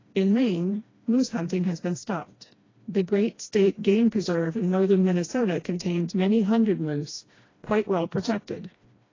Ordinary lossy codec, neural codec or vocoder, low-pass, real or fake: AAC, 32 kbps; codec, 16 kHz, 1 kbps, FreqCodec, smaller model; 7.2 kHz; fake